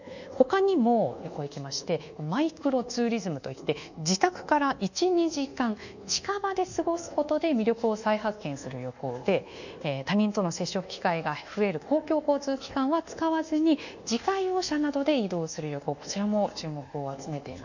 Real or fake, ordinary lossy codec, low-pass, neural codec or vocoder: fake; none; 7.2 kHz; codec, 24 kHz, 1.2 kbps, DualCodec